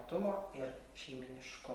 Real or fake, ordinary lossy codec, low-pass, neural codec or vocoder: fake; Opus, 24 kbps; 19.8 kHz; vocoder, 44.1 kHz, 128 mel bands every 512 samples, BigVGAN v2